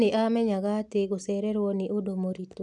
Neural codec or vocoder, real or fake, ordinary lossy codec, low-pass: none; real; none; none